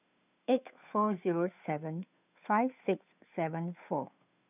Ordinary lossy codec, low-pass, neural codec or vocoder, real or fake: none; 3.6 kHz; codec, 16 kHz, 2 kbps, FunCodec, trained on Chinese and English, 25 frames a second; fake